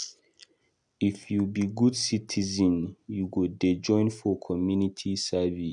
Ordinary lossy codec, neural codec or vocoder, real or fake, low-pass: none; none; real; 10.8 kHz